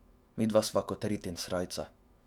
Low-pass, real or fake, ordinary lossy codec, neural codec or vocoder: 19.8 kHz; fake; Opus, 64 kbps; autoencoder, 48 kHz, 128 numbers a frame, DAC-VAE, trained on Japanese speech